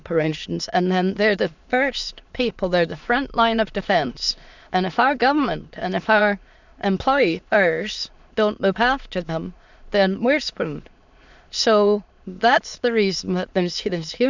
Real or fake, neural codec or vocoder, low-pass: fake; autoencoder, 22.05 kHz, a latent of 192 numbers a frame, VITS, trained on many speakers; 7.2 kHz